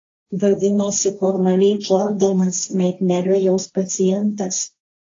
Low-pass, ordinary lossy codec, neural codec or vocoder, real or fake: 7.2 kHz; AAC, 32 kbps; codec, 16 kHz, 1.1 kbps, Voila-Tokenizer; fake